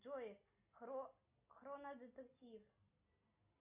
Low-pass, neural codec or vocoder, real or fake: 3.6 kHz; none; real